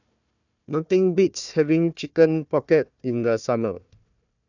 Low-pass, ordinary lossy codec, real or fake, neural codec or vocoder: 7.2 kHz; none; fake; codec, 16 kHz, 1 kbps, FunCodec, trained on Chinese and English, 50 frames a second